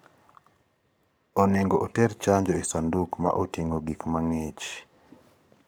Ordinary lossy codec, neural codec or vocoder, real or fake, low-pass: none; codec, 44.1 kHz, 7.8 kbps, Pupu-Codec; fake; none